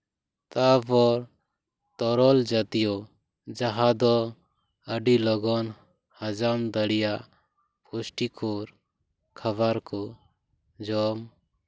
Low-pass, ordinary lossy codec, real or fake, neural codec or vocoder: none; none; real; none